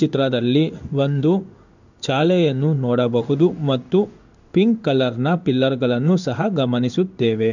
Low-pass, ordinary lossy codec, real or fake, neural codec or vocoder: 7.2 kHz; none; fake; codec, 16 kHz in and 24 kHz out, 1 kbps, XY-Tokenizer